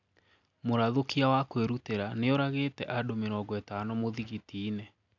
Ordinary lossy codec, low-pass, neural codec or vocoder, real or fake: none; 7.2 kHz; none; real